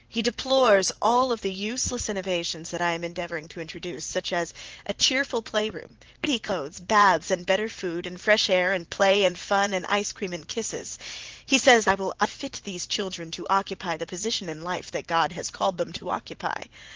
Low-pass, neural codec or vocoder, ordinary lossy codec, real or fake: 7.2 kHz; vocoder, 44.1 kHz, 80 mel bands, Vocos; Opus, 16 kbps; fake